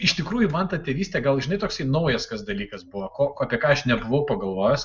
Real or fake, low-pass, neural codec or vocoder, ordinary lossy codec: real; 7.2 kHz; none; Opus, 64 kbps